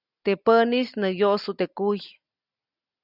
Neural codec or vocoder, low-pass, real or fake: none; 5.4 kHz; real